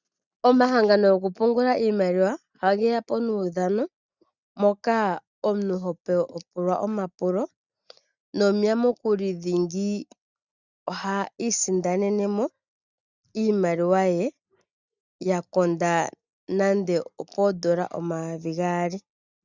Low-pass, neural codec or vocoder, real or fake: 7.2 kHz; none; real